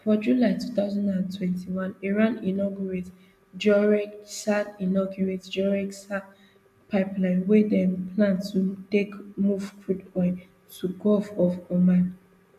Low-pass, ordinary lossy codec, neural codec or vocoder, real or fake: 14.4 kHz; MP3, 96 kbps; none; real